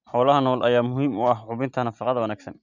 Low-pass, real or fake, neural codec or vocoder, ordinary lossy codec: 7.2 kHz; real; none; none